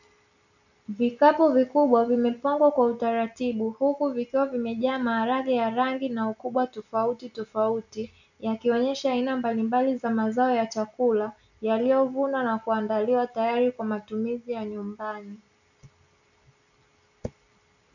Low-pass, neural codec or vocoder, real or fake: 7.2 kHz; none; real